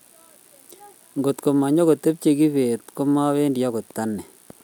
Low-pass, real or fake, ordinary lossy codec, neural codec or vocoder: 19.8 kHz; real; none; none